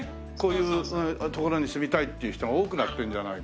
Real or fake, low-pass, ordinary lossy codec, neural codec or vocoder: real; none; none; none